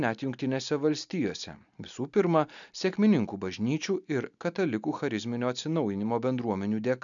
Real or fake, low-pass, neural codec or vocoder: real; 7.2 kHz; none